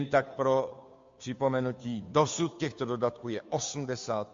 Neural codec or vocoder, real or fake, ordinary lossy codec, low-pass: codec, 16 kHz, 2 kbps, FunCodec, trained on Chinese and English, 25 frames a second; fake; MP3, 48 kbps; 7.2 kHz